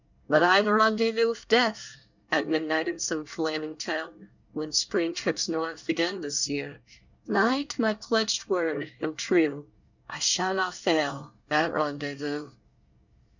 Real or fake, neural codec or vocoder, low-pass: fake; codec, 24 kHz, 1 kbps, SNAC; 7.2 kHz